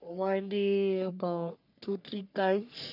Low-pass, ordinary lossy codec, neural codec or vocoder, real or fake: 5.4 kHz; none; codec, 44.1 kHz, 3.4 kbps, Pupu-Codec; fake